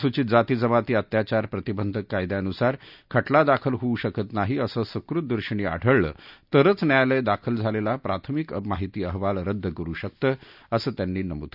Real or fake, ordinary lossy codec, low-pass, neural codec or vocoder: real; none; 5.4 kHz; none